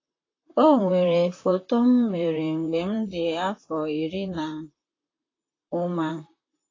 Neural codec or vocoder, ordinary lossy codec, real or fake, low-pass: vocoder, 44.1 kHz, 128 mel bands, Pupu-Vocoder; AAC, 32 kbps; fake; 7.2 kHz